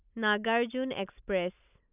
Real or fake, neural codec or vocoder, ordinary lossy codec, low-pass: real; none; none; 3.6 kHz